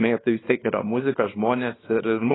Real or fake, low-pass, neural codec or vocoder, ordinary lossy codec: fake; 7.2 kHz; codec, 24 kHz, 0.9 kbps, WavTokenizer, small release; AAC, 16 kbps